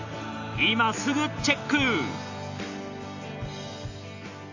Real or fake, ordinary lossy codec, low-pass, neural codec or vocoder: real; AAC, 48 kbps; 7.2 kHz; none